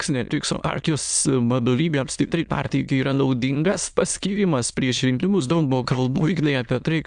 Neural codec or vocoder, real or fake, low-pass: autoencoder, 22.05 kHz, a latent of 192 numbers a frame, VITS, trained on many speakers; fake; 9.9 kHz